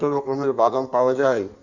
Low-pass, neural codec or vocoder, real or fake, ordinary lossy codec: 7.2 kHz; codec, 16 kHz in and 24 kHz out, 1.1 kbps, FireRedTTS-2 codec; fake; none